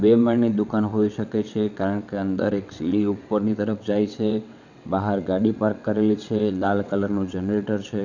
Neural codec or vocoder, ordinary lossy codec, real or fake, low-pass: vocoder, 22.05 kHz, 80 mel bands, WaveNeXt; none; fake; 7.2 kHz